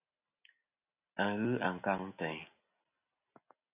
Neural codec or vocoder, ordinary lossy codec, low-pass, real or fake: none; AAC, 24 kbps; 3.6 kHz; real